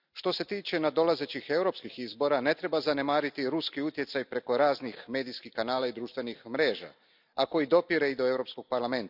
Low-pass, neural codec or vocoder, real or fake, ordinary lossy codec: 5.4 kHz; none; real; none